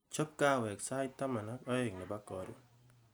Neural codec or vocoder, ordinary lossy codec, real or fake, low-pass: none; none; real; none